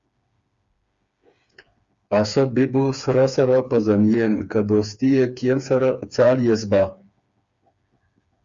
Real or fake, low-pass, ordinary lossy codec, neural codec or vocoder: fake; 7.2 kHz; Opus, 64 kbps; codec, 16 kHz, 4 kbps, FreqCodec, smaller model